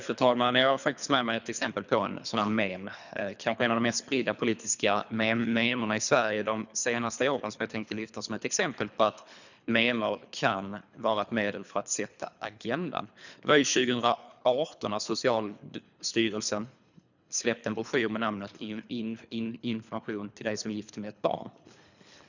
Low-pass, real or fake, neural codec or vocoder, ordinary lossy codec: 7.2 kHz; fake; codec, 24 kHz, 3 kbps, HILCodec; none